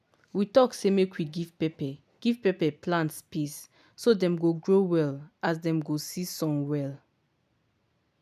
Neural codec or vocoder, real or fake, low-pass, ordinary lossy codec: none; real; 14.4 kHz; none